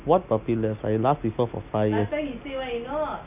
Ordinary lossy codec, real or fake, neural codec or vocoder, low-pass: none; real; none; 3.6 kHz